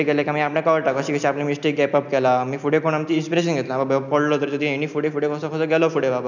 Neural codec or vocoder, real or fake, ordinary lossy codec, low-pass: none; real; none; 7.2 kHz